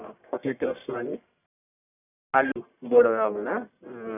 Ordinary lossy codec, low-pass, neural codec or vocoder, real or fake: none; 3.6 kHz; codec, 44.1 kHz, 1.7 kbps, Pupu-Codec; fake